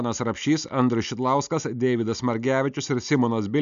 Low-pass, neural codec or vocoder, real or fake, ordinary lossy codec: 7.2 kHz; none; real; MP3, 96 kbps